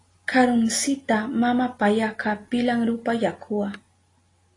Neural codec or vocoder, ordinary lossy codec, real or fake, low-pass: none; AAC, 32 kbps; real; 10.8 kHz